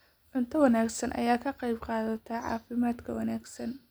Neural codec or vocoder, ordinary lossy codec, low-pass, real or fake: none; none; none; real